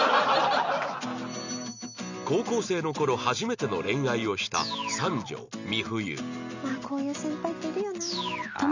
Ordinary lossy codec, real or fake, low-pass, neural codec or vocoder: none; real; 7.2 kHz; none